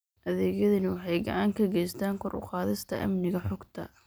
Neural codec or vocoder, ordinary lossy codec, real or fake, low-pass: none; none; real; none